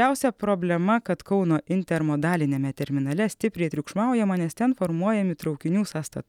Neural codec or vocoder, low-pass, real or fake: none; 19.8 kHz; real